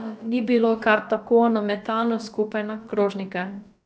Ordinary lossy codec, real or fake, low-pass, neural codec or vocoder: none; fake; none; codec, 16 kHz, about 1 kbps, DyCAST, with the encoder's durations